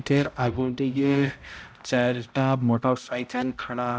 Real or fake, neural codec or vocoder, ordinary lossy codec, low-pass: fake; codec, 16 kHz, 0.5 kbps, X-Codec, HuBERT features, trained on balanced general audio; none; none